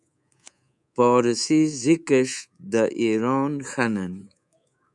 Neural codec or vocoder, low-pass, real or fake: codec, 24 kHz, 3.1 kbps, DualCodec; 10.8 kHz; fake